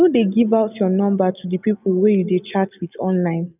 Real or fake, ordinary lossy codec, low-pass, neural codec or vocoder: real; none; 3.6 kHz; none